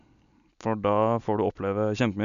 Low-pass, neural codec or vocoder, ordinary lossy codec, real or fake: 7.2 kHz; none; none; real